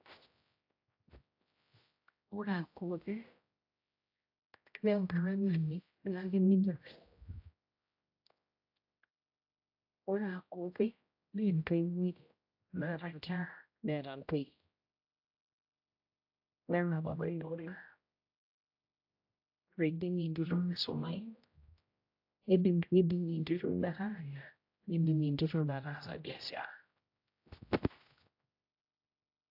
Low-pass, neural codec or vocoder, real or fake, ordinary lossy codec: 5.4 kHz; codec, 16 kHz, 0.5 kbps, X-Codec, HuBERT features, trained on general audio; fake; AAC, 48 kbps